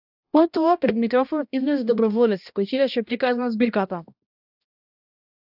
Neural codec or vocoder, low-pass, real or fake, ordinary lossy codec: codec, 16 kHz, 1 kbps, X-Codec, HuBERT features, trained on balanced general audio; 5.4 kHz; fake; AAC, 48 kbps